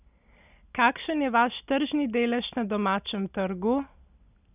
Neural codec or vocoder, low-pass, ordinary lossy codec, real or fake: none; 3.6 kHz; none; real